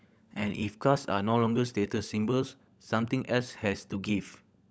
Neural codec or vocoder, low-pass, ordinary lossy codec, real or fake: codec, 16 kHz, 16 kbps, FunCodec, trained on LibriTTS, 50 frames a second; none; none; fake